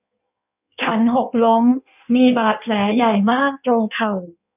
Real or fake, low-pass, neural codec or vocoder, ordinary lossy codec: fake; 3.6 kHz; codec, 16 kHz in and 24 kHz out, 1.1 kbps, FireRedTTS-2 codec; none